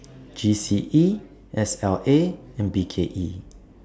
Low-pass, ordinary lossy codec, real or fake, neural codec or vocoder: none; none; real; none